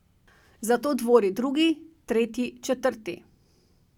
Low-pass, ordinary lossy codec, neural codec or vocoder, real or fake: 19.8 kHz; none; none; real